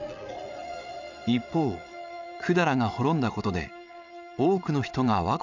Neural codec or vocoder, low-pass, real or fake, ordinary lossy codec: vocoder, 22.05 kHz, 80 mel bands, Vocos; 7.2 kHz; fake; none